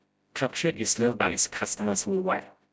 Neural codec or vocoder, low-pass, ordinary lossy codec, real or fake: codec, 16 kHz, 0.5 kbps, FreqCodec, smaller model; none; none; fake